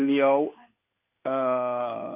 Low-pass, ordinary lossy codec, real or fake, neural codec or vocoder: 3.6 kHz; AAC, 32 kbps; fake; codec, 16 kHz in and 24 kHz out, 1 kbps, XY-Tokenizer